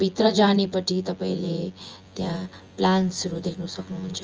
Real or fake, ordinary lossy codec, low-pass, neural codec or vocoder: fake; Opus, 24 kbps; 7.2 kHz; vocoder, 24 kHz, 100 mel bands, Vocos